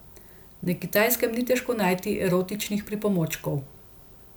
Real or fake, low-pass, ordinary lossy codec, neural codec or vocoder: real; none; none; none